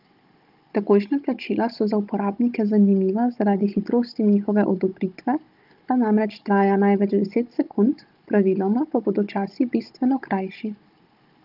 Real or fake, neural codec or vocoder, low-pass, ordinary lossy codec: fake; codec, 16 kHz, 16 kbps, FunCodec, trained on Chinese and English, 50 frames a second; 5.4 kHz; Opus, 32 kbps